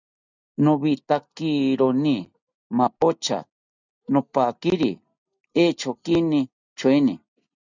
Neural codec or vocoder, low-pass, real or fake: none; 7.2 kHz; real